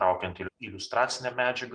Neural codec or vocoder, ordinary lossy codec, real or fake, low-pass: none; Opus, 24 kbps; real; 9.9 kHz